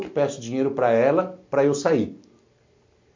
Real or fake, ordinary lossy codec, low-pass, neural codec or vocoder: real; MP3, 48 kbps; 7.2 kHz; none